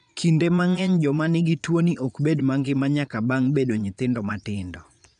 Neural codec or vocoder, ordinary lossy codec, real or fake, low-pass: vocoder, 22.05 kHz, 80 mel bands, Vocos; none; fake; 9.9 kHz